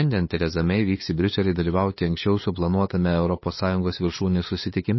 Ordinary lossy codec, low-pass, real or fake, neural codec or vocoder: MP3, 24 kbps; 7.2 kHz; fake; codec, 16 kHz, 8 kbps, FunCodec, trained on LibriTTS, 25 frames a second